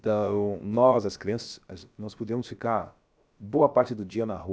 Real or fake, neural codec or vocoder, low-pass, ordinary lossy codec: fake; codec, 16 kHz, about 1 kbps, DyCAST, with the encoder's durations; none; none